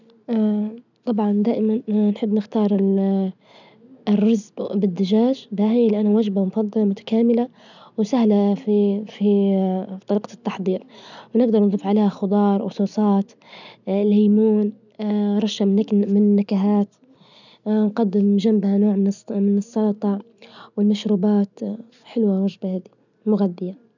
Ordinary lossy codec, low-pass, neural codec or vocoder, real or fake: none; 7.2 kHz; none; real